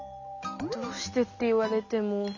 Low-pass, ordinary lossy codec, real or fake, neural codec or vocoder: 7.2 kHz; none; real; none